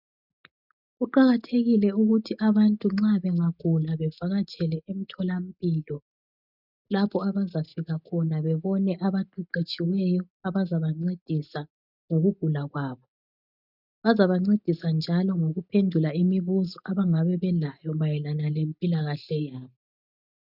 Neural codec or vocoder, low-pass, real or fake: none; 5.4 kHz; real